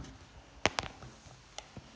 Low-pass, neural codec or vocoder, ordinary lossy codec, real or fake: none; none; none; real